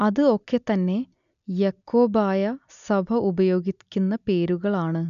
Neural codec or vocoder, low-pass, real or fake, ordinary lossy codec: none; 7.2 kHz; real; none